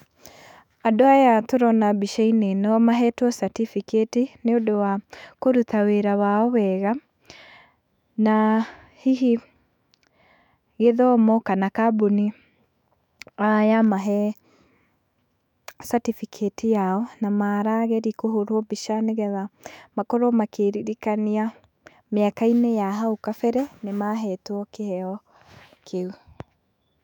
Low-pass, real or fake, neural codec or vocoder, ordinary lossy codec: 19.8 kHz; fake; autoencoder, 48 kHz, 128 numbers a frame, DAC-VAE, trained on Japanese speech; none